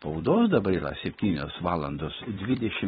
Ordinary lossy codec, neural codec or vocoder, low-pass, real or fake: AAC, 16 kbps; vocoder, 44.1 kHz, 128 mel bands every 256 samples, BigVGAN v2; 19.8 kHz; fake